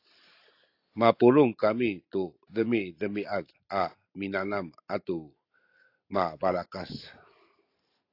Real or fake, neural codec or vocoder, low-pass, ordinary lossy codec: real; none; 5.4 kHz; AAC, 32 kbps